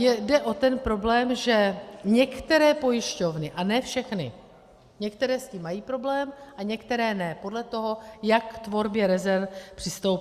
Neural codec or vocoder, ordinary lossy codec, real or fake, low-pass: none; Opus, 64 kbps; real; 14.4 kHz